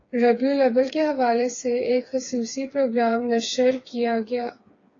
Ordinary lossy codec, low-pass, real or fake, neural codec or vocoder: AAC, 32 kbps; 7.2 kHz; fake; codec, 16 kHz, 4 kbps, FreqCodec, smaller model